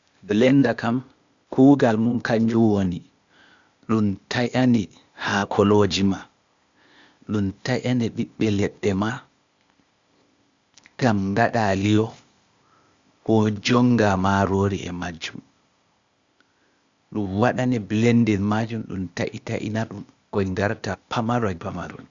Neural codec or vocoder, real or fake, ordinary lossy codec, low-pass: codec, 16 kHz, 0.8 kbps, ZipCodec; fake; Opus, 64 kbps; 7.2 kHz